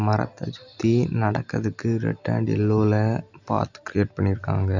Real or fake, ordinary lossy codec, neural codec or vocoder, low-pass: real; none; none; 7.2 kHz